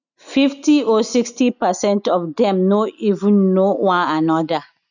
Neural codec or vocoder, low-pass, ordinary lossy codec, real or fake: none; 7.2 kHz; none; real